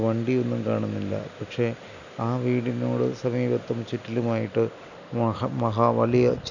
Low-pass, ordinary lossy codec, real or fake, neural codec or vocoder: 7.2 kHz; none; real; none